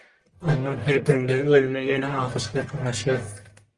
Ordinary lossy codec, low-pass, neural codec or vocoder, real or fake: Opus, 24 kbps; 10.8 kHz; codec, 44.1 kHz, 1.7 kbps, Pupu-Codec; fake